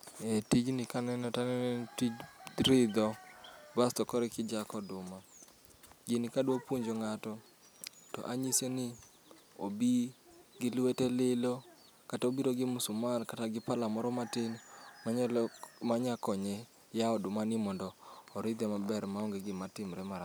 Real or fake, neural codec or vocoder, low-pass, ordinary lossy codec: real; none; none; none